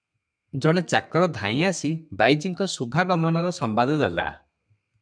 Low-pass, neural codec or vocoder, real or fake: 9.9 kHz; codec, 32 kHz, 1.9 kbps, SNAC; fake